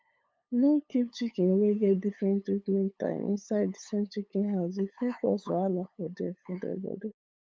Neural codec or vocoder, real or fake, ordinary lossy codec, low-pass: codec, 16 kHz, 8 kbps, FunCodec, trained on LibriTTS, 25 frames a second; fake; none; none